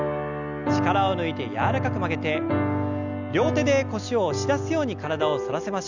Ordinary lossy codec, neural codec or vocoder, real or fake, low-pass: none; none; real; 7.2 kHz